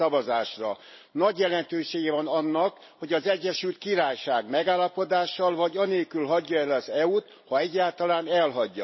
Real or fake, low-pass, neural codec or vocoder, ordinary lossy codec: real; 7.2 kHz; none; MP3, 24 kbps